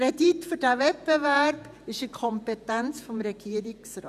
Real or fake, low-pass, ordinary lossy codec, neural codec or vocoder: fake; 14.4 kHz; none; vocoder, 48 kHz, 128 mel bands, Vocos